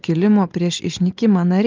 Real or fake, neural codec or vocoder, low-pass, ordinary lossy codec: real; none; 7.2 kHz; Opus, 24 kbps